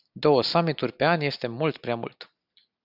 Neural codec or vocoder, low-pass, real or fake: none; 5.4 kHz; real